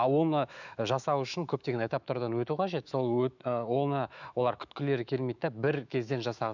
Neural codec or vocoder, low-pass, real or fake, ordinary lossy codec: autoencoder, 48 kHz, 128 numbers a frame, DAC-VAE, trained on Japanese speech; 7.2 kHz; fake; none